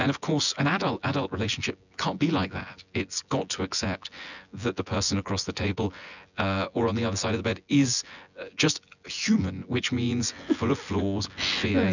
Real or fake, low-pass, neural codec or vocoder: fake; 7.2 kHz; vocoder, 24 kHz, 100 mel bands, Vocos